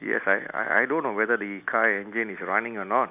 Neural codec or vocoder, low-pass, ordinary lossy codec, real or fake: none; 3.6 kHz; none; real